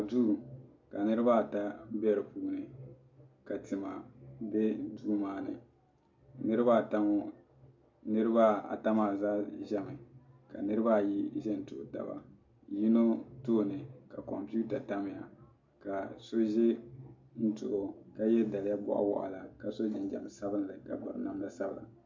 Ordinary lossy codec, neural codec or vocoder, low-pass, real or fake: MP3, 48 kbps; none; 7.2 kHz; real